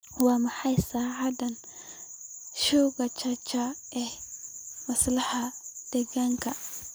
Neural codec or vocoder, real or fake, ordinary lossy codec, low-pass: none; real; none; none